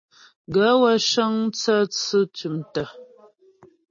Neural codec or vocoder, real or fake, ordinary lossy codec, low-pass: none; real; MP3, 32 kbps; 7.2 kHz